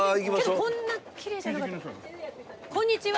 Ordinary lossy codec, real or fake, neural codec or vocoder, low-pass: none; real; none; none